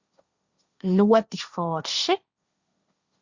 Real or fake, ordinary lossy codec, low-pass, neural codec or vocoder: fake; Opus, 64 kbps; 7.2 kHz; codec, 16 kHz, 1.1 kbps, Voila-Tokenizer